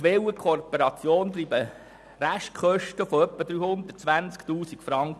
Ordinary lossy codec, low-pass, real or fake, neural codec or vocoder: none; none; real; none